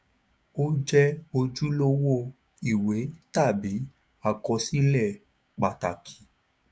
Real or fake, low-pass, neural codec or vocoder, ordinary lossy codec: fake; none; codec, 16 kHz, 6 kbps, DAC; none